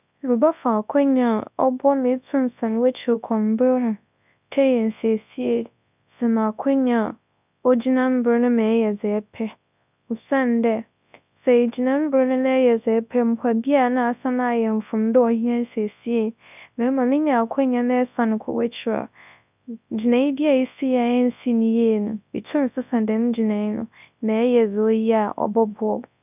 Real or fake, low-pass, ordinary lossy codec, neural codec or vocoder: fake; 3.6 kHz; none; codec, 24 kHz, 0.9 kbps, WavTokenizer, large speech release